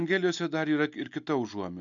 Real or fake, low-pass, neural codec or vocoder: real; 7.2 kHz; none